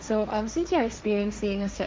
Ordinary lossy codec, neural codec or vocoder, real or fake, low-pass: none; codec, 16 kHz, 1.1 kbps, Voila-Tokenizer; fake; none